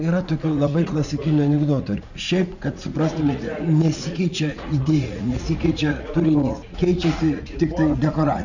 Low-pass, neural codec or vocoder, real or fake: 7.2 kHz; vocoder, 44.1 kHz, 80 mel bands, Vocos; fake